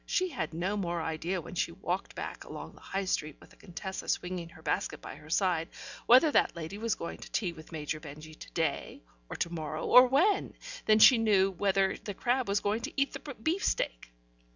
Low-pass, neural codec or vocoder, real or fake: 7.2 kHz; none; real